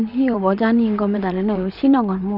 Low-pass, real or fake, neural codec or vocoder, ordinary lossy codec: 5.4 kHz; fake; vocoder, 44.1 kHz, 128 mel bands, Pupu-Vocoder; none